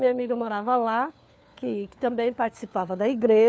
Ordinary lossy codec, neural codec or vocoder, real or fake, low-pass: none; codec, 16 kHz, 4 kbps, FunCodec, trained on LibriTTS, 50 frames a second; fake; none